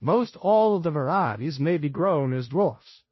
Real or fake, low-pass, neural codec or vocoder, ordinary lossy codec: fake; 7.2 kHz; codec, 16 kHz, 0.5 kbps, FunCodec, trained on Chinese and English, 25 frames a second; MP3, 24 kbps